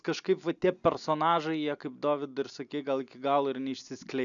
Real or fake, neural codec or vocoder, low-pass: real; none; 7.2 kHz